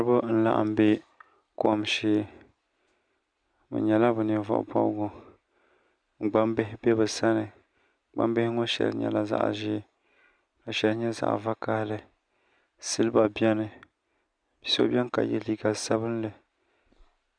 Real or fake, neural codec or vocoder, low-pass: real; none; 9.9 kHz